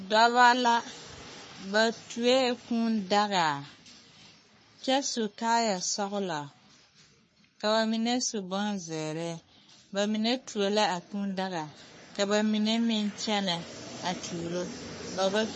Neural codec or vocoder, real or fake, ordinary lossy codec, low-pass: codec, 44.1 kHz, 3.4 kbps, Pupu-Codec; fake; MP3, 32 kbps; 10.8 kHz